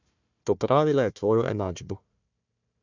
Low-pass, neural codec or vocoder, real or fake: 7.2 kHz; codec, 16 kHz, 1 kbps, FunCodec, trained on Chinese and English, 50 frames a second; fake